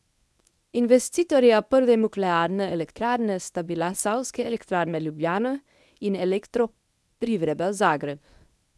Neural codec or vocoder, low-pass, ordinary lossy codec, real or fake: codec, 24 kHz, 0.9 kbps, WavTokenizer, medium speech release version 1; none; none; fake